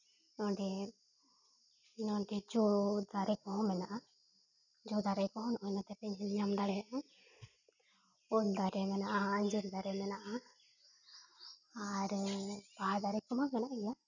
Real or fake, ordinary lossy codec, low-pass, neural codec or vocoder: real; none; 7.2 kHz; none